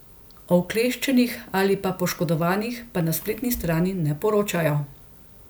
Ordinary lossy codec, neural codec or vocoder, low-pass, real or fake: none; none; none; real